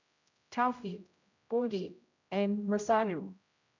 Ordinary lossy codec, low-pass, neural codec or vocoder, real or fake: none; 7.2 kHz; codec, 16 kHz, 0.5 kbps, X-Codec, HuBERT features, trained on general audio; fake